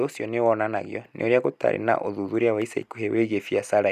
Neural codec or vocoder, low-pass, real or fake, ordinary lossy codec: none; 14.4 kHz; real; none